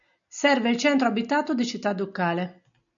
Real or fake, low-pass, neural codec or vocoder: real; 7.2 kHz; none